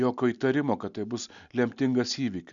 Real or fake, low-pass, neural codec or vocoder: real; 7.2 kHz; none